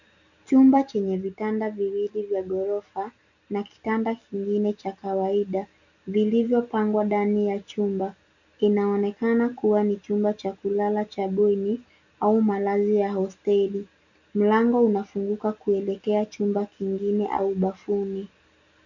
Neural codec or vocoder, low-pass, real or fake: none; 7.2 kHz; real